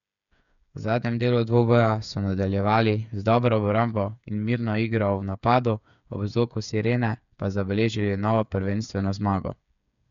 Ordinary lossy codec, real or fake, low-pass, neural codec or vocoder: none; fake; 7.2 kHz; codec, 16 kHz, 8 kbps, FreqCodec, smaller model